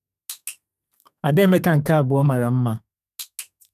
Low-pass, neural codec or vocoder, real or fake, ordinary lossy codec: 14.4 kHz; codec, 32 kHz, 1.9 kbps, SNAC; fake; none